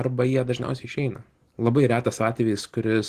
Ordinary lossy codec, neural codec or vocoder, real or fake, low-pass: Opus, 16 kbps; none; real; 14.4 kHz